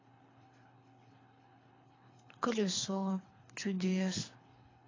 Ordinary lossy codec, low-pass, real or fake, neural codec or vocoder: AAC, 32 kbps; 7.2 kHz; fake; codec, 24 kHz, 3 kbps, HILCodec